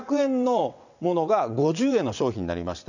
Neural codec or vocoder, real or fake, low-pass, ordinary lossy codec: vocoder, 22.05 kHz, 80 mel bands, WaveNeXt; fake; 7.2 kHz; none